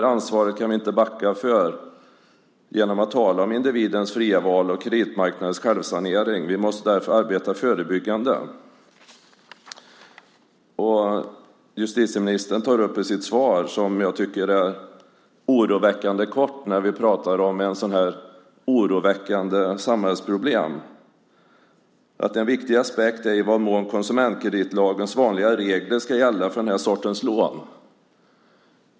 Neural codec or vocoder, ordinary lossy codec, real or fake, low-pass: none; none; real; none